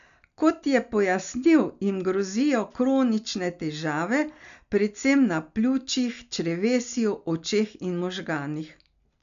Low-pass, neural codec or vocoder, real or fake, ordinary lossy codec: 7.2 kHz; none; real; none